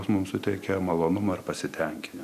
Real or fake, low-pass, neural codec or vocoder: real; 14.4 kHz; none